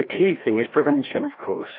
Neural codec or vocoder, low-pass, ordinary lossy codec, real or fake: codec, 16 kHz, 1 kbps, FreqCodec, larger model; 5.4 kHz; AAC, 32 kbps; fake